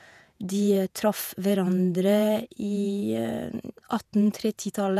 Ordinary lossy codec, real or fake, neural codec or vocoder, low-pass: none; fake; vocoder, 48 kHz, 128 mel bands, Vocos; 14.4 kHz